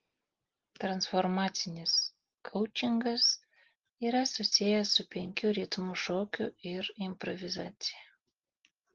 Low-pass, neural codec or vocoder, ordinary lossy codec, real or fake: 7.2 kHz; none; Opus, 16 kbps; real